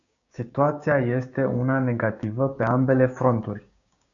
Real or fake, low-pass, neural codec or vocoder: fake; 7.2 kHz; codec, 16 kHz, 6 kbps, DAC